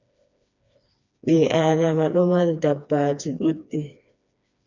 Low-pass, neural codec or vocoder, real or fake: 7.2 kHz; codec, 16 kHz, 2 kbps, FreqCodec, smaller model; fake